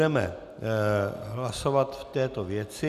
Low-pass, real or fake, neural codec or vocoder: 14.4 kHz; real; none